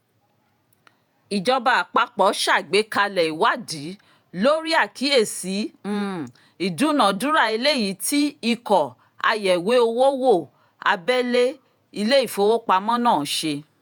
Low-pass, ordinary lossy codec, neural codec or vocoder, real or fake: none; none; vocoder, 48 kHz, 128 mel bands, Vocos; fake